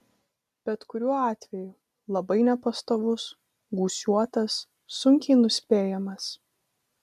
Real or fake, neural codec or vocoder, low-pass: real; none; 14.4 kHz